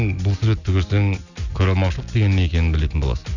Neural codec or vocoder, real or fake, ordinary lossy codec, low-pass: none; real; none; 7.2 kHz